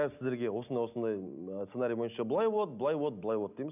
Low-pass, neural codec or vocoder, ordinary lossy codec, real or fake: 3.6 kHz; none; none; real